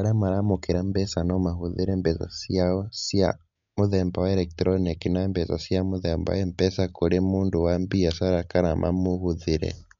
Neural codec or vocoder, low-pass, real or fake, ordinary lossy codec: none; 7.2 kHz; real; MP3, 64 kbps